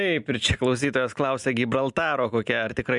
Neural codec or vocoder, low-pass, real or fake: none; 10.8 kHz; real